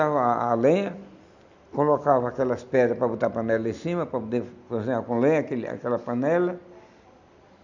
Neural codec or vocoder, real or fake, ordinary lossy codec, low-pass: none; real; none; 7.2 kHz